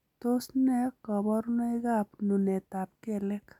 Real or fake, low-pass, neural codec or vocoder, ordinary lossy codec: real; 19.8 kHz; none; none